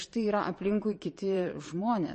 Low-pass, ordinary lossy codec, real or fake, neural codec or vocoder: 9.9 kHz; MP3, 32 kbps; fake; vocoder, 22.05 kHz, 80 mel bands, Vocos